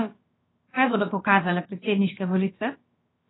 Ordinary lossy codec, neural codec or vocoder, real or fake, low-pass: AAC, 16 kbps; codec, 16 kHz, about 1 kbps, DyCAST, with the encoder's durations; fake; 7.2 kHz